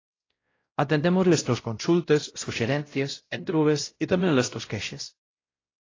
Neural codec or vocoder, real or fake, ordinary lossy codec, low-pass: codec, 16 kHz, 0.5 kbps, X-Codec, WavLM features, trained on Multilingual LibriSpeech; fake; AAC, 32 kbps; 7.2 kHz